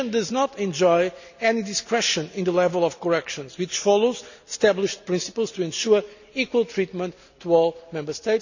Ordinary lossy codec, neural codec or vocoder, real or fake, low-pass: none; none; real; 7.2 kHz